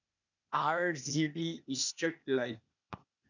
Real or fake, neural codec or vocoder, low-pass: fake; codec, 16 kHz, 0.8 kbps, ZipCodec; 7.2 kHz